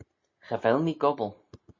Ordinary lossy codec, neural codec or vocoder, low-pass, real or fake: MP3, 32 kbps; none; 7.2 kHz; real